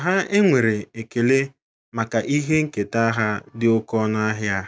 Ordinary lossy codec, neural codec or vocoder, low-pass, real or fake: none; none; none; real